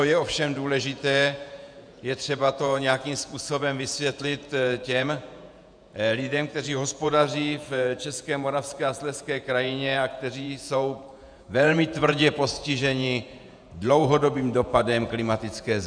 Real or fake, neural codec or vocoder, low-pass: fake; vocoder, 48 kHz, 128 mel bands, Vocos; 9.9 kHz